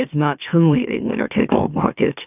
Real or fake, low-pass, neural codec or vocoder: fake; 3.6 kHz; autoencoder, 44.1 kHz, a latent of 192 numbers a frame, MeloTTS